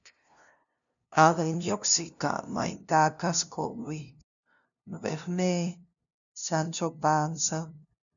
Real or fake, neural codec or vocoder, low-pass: fake; codec, 16 kHz, 0.5 kbps, FunCodec, trained on LibriTTS, 25 frames a second; 7.2 kHz